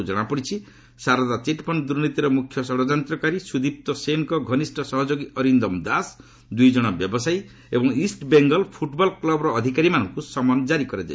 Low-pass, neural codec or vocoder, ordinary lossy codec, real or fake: none; none; none; real